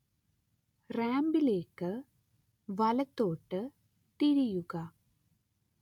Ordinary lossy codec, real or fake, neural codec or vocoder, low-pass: none; real; none; 19.8 kHz